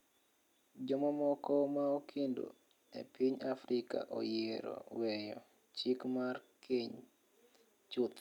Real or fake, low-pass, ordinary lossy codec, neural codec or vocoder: real; 19.8 kHz; none; none